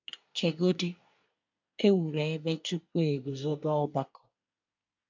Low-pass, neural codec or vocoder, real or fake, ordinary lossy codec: 7.2 kHz; codec, 24 kHz, 1 kbps, SNAC; fake; MP3, 64 kbps